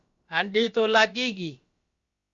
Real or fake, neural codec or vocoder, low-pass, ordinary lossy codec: fake; codec, 16 kHz, about 1 kbps, DyCAST, with the encoder's durations; 7.2 kHz; Opus, 64 kbps